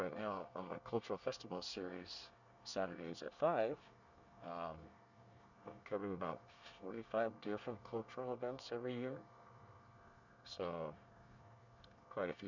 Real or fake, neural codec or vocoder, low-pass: fake; codec, 24 kHz, 1 kbps, SNAC; 7.2 kHz